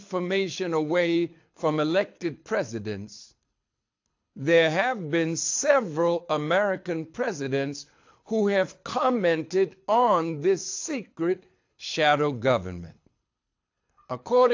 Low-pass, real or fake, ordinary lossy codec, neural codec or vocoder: 7.2 kHz; fake; AAC, 48 kbps; codec, 24 kHz, 6 kbps, HILCodec